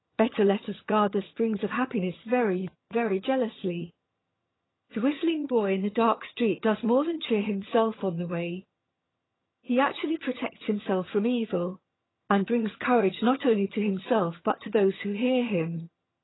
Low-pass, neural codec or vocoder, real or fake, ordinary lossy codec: 7.2 kHz; vocoder, 22.05 kHz, 80 mel bands, HiFi-GAN; fake; AAC, 16 kbps